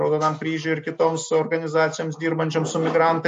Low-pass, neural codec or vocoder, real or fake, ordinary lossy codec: 10.8 kHz; none; real; MP3, 48 kbps